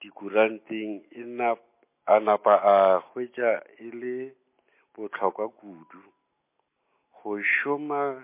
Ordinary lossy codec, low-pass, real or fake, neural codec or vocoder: MP3, 24 kbps; 3.6 kHz; real; none